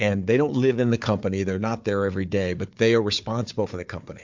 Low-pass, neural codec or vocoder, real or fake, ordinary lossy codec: 7.2 kHz; codec, 16 kHz, 4 kbps, FunCodec, trained on Chinese and English, 50 frames a second; fake; MP3, 64 kbps